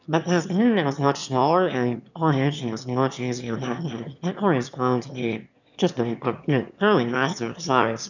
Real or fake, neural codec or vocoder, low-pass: fake; autoencoder, 22.05 kHz, a latent of 192 numbers a frame, VITS, trained on one speaker; 7.2 kHz